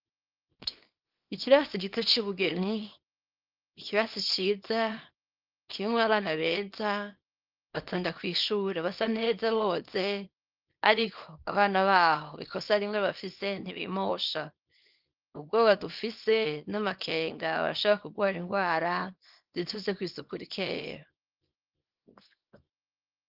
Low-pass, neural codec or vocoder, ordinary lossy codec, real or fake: 5.4 kHz; codec, 24 kHz, 0.9 kbps, WavTokenizer, small release; Opus, 32 kbps; fake